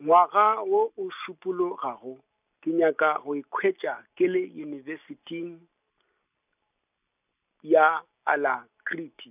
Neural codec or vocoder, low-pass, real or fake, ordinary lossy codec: none; 3.6 kHz; real; none